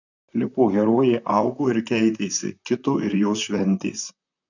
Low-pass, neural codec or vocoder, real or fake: 7.2 kHz; vocoder, 44.1 kHz, 128 mel bands, Pupu-Vocoder; fake